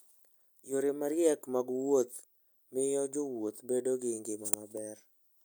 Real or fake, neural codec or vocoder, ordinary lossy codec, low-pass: real; none; none; none